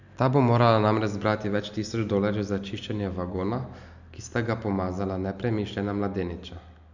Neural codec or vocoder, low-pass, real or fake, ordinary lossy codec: none; 7.2 kHz; real; none